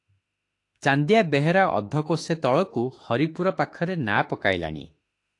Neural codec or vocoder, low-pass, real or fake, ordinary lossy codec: autoencoder, 48 kHz, 32 numbers a frame, DAC-VAE, trained on Japanese speech; 10.8 kHz; fake; AAC, 48 kbps